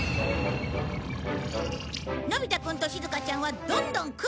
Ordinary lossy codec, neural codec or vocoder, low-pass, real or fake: none; none; none; real